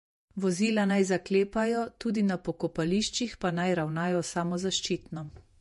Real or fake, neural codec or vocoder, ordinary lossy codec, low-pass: fake; vocoder, 48 kHz, 128 mel bands, Vocos; MP3, 48 kbps; 14.4 kHz